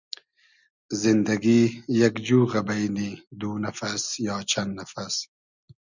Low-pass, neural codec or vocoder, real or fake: 7.2 kHz; none; real